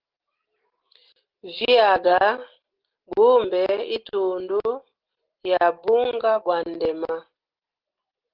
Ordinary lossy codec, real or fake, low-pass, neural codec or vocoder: Opus, 16 kbps; real; 5.4 kHz; none